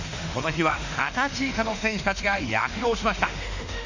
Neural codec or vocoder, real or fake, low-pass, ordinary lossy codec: autoencoder, 48 kHz, 32 numbers a frame, DAC-VAE, trained on Japanese speech; fake; 7.2 kHz; none